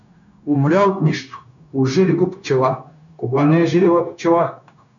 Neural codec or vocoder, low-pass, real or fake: codec, 16 kHz, 0.9 kbps, LongCat-Audio-Codec; 7.2 kHz; fake